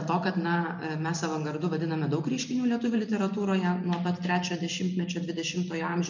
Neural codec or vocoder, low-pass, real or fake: none; 7.2 kHz; real